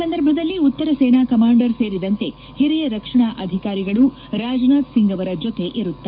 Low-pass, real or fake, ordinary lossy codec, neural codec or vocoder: 5.4 kHz; fake; none; codec, 44.1 kHz, 7.8 kbps, DAC